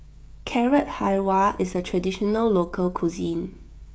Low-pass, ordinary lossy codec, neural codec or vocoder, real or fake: none; none; codec, 16 kHz, 16 kbps, FreqCodec, smaller model; fake